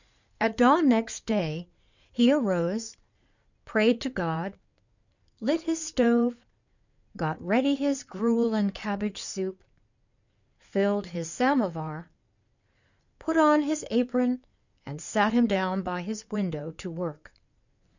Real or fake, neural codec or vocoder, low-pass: fake; codec, 16 kHz in and 24 kHz out, 2.2 kbps, FireRedTTS-2 codec; 7.2 kHz